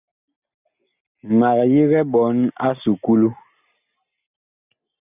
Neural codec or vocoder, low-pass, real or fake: none; 3.6 kHz; real